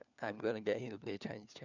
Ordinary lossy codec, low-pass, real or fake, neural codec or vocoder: none; 7.2 kHz; fake; codec, 16 kHz, 4 kbps, FunCodec, trained on LibriTTS, 50 frames a second